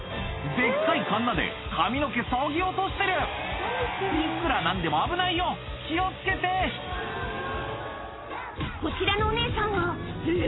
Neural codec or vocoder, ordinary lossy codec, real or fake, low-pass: none; AAC, 16 kbps; real; 7.2 kHz